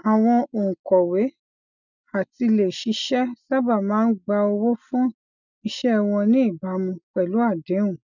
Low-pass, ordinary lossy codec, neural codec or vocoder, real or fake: 7.2 kHz; none; none; real